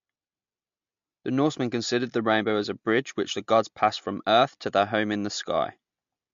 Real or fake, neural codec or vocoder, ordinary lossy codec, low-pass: real; none; MP3, 48 kbps; 7.2 kHz